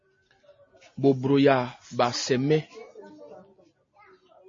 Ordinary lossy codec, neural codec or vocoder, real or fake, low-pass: MP3, 32 kbps; none; real; 7.2 kHz